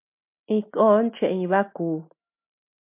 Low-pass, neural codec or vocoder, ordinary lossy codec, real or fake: 3.6 kHz; none; MP3, 32 kbps; real